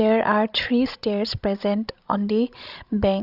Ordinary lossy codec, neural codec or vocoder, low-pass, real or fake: Opus, 64 kbps; codec, 16 kHz, 16 kbps, FreqCodec, larger model; 5.4 kHz; fake